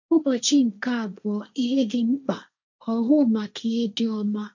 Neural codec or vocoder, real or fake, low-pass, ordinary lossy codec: codec, 16 kHz, 1.1 kbps, Voila-Tokenizer; fake; 7.2 kHz; none